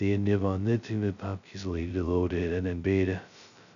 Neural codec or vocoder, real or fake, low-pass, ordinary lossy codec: codec, 16 kHz, 0.2 kbps, FocalCodec; fake; 7.2 kHz; none